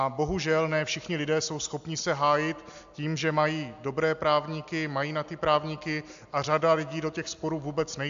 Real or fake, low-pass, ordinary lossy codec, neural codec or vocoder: real; 7.2 kHz; AAC, 64 kbps; none